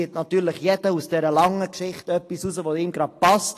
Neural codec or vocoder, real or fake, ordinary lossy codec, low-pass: none; real; AAC, 48 kbps; 14.4 kHz